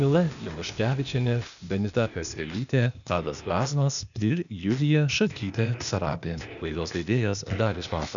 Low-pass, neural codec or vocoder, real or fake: 7.2 kHz; codec, 16 kHz, 0.8 kbps, ZipCodec; fake